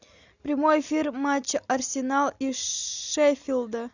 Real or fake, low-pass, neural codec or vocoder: real; 7.2 kHz; none